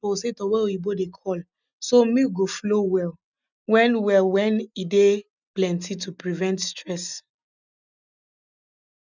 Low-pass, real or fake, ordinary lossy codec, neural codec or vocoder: 7.2 kHz; real; none; none